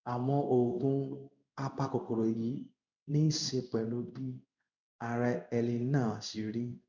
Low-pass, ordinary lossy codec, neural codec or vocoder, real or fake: 7.2 kHz; none; codec, 16 kHz in and 24 kHz out, 1 kbps, XY-Tokenizer; fake